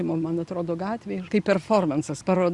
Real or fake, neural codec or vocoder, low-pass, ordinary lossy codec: real; none; 10.8 kHz; Opus, 64 kbps